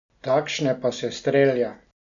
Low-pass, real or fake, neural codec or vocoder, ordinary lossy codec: 7.2 kHz; real; none; none